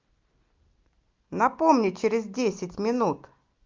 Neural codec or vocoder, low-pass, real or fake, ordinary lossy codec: none; 7.2 kHz; real; Opus, 24 kbps